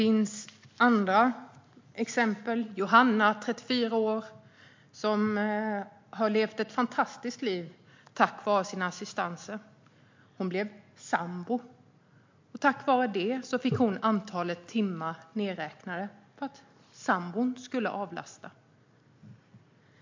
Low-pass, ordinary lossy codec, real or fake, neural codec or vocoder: 7.2 kHz; MP3, 48 kbps; real; none